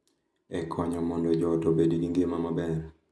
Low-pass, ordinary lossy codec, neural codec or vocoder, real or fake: none; none; none; real